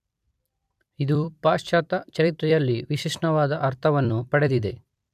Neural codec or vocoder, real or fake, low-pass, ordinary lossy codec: vocoder, 44.1 kHz, 128 mel bands every 256 samples, BigVGAN v2; fake; 14.4 kHz; AAC, 96 kbps